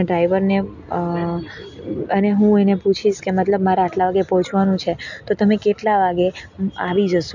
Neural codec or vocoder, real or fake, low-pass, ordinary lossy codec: none; real; 7.2 kHz; none